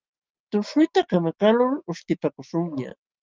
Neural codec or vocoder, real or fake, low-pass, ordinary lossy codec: none; real; 7.2 kHz; Opus, 32 kbps